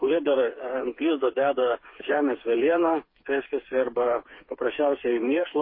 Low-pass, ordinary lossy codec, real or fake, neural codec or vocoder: 5.4 kHz; MP3, 24 kbps; fake; codec, 16 kHz, 4 kbps, FreqCodec, smaller model